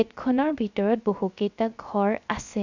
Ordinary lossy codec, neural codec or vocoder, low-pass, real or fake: none; codec, 16 kHz, 0.3 kbps, FocalCodec; 7.2 kHz; fake